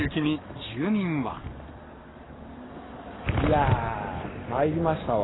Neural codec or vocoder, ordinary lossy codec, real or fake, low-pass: codec, 44.1 kHz, 7.8 kbps, Pupu-Codec; AAC, 16 kbps; fake; 7.2 kHz